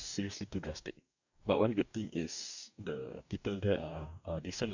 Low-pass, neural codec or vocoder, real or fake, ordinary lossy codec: 7.2 kHz; codec, 44.1 kHz, 2.6 kbps, DAC; fake; none